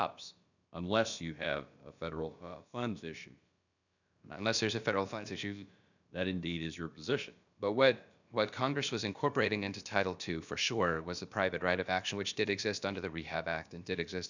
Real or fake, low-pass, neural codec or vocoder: fake; 7.2 kHz; codec, 16 kHz, about 1 kbps, DyCAST, with the encoder's durations